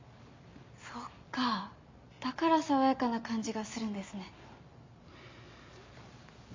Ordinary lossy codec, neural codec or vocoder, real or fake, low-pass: MP3, 48 kbps; none; real; 7.2 kHz